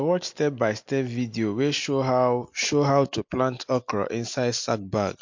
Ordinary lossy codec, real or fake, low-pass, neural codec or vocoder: MP3, 48 kbps; real; 7.2 kHz; none